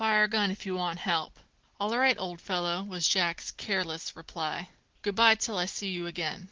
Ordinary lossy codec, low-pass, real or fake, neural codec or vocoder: Opus, 16 kbps; 7.2 kHz; real; none